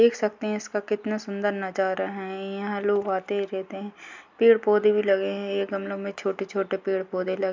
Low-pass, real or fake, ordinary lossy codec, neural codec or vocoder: 7.2 kHz; real; MP3, 64 kbps; none